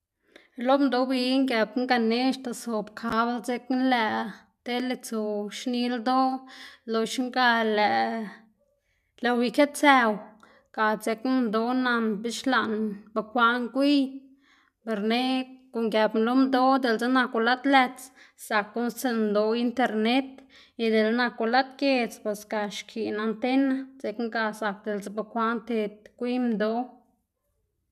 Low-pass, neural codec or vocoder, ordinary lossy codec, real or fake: 14.4 kHz; none; none; real